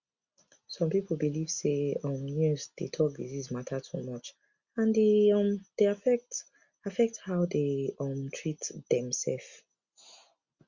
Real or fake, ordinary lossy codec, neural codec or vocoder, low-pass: real; Opus, 64 kbps; none; 7.2 kHz